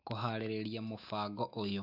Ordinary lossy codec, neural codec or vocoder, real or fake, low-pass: AAC, 48 kbps; autoencoder, 48 kHz, 128 numbers a frame, DAC-VAE, trained on Japanese speech; fake; 5.4 kHz